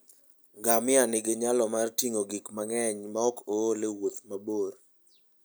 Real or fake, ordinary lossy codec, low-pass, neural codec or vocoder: real; none; none; none